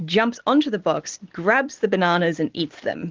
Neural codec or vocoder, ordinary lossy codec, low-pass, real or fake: none; Opus, 16 kbps; 7.2 kHz; real